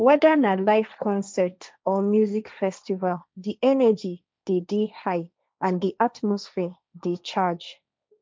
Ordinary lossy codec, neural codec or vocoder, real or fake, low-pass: none; codec, 16 kHz, 1.1 kbps, Voila-Tokenizer; fake; none